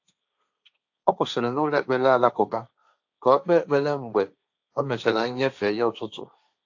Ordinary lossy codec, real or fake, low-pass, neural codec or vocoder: AAC, 48 kbps; fake; 7.2 kHz; codec, 16 kHz, 1.1 kbps, Voila-Tokenizer